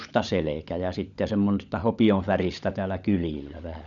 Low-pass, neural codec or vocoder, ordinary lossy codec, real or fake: 7.2 kHz; codec, 16 kHz, 16 kbps, FunCodec, trained on LibriTTS, 50 frames a second; none; fake